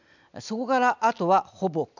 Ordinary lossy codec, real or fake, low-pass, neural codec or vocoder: none; real; 7.2 kHz; none